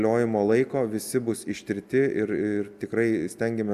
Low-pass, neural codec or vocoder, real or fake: 14.4 kHz; none; real